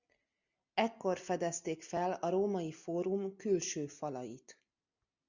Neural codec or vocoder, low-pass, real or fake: none; 7.2 kHz; real